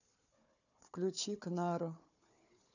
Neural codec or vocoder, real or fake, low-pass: codec, 16 kHz, 4 kbps, FunCodec, trained on Chinese and English, 50 frames a second; fake; 7.2 kHz